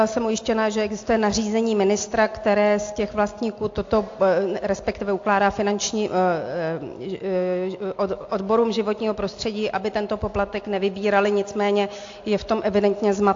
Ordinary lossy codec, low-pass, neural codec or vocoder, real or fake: AAC, 48 kbps; 7.2 kHz; none; real